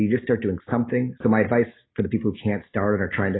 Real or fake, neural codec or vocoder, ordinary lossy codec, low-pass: real; none; AAC, 16 kbps; 7.2 kHz